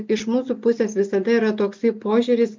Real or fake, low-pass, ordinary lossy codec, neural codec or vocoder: real; 7.2 kHz; MP3, 64 kbps; none